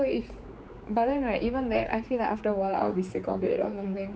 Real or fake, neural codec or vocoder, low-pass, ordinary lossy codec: fake; codec, 16 kHz, 4 kbps, X-Codec, HuBERT features, trained on general audio; none; none